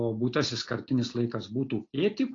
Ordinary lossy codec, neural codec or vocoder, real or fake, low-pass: AAC, 32 kbps; none; real; 7.2 kHz